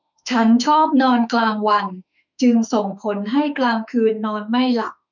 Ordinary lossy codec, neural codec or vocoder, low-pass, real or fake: none; autoencoder, 48 kHz, 32 numbers a frame, DAC-VAE, trained on Japanese speech; 7.2 kHz; fake